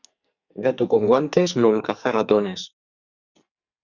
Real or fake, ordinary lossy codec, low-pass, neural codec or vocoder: fake; Opus, 64 kbps; 7.2 kHz; codec, 44.1 kHz, 2.6 kbps, SNAC